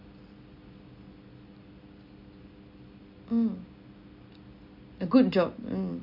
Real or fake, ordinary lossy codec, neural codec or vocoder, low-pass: real; none; none; 5.4 kHz